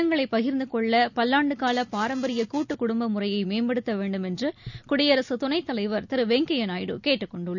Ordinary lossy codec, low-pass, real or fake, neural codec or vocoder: none; 7.2 kHz; real; none